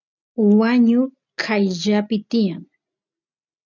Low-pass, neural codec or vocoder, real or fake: 7.2 kHz; none; real